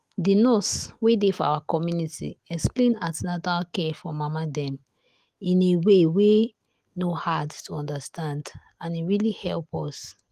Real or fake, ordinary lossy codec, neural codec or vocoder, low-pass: fake; Opus, 24 kbps; autoencoder, 48 kHz, 128 numbers a frame, DAC-VAE, trained on Japanese speech; 14.4 kHz